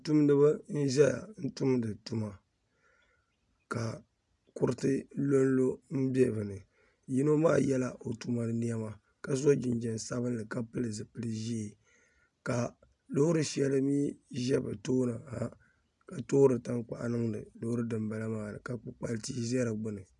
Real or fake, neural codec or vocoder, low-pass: real; none; 10.8 kHz